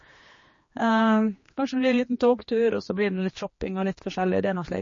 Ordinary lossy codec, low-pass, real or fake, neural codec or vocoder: MP3, 32 kbps; 7.2 kHz; fake; codec, 16 kHz, 2 kbps, X-Codec, HuBERT features, trained on general audio